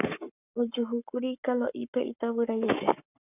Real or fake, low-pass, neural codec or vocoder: fake; 3.6 kHz; codec, 44.1 kHz, 7.8 kbps, Pupu-Codec